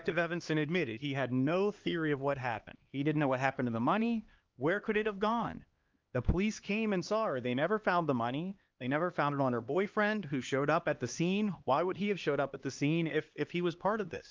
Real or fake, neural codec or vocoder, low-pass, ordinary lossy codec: fake; codec, 16 kHz, 2 kbps, X-Codec, HuBERT features, trained on LibriSpeech; 7.2 kHz; Opus, 24 kbps